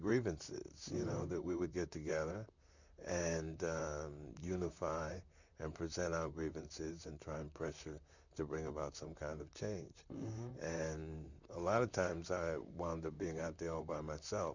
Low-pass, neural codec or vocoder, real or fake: 7.2 kHz; vocoder, 44.1 kHz, 128 mel bands, Pupu-Vocoder; fake